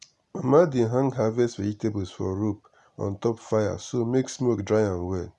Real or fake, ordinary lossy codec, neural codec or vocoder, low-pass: real; none; none; 10.8 kHz